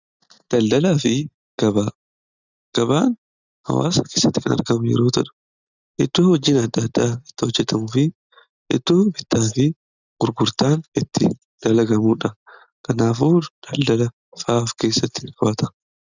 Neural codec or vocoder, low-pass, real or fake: none; 7.2 kHz; real